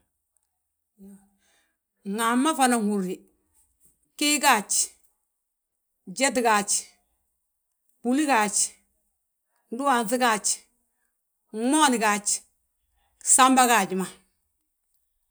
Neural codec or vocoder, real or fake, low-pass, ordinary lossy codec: none; real; none; none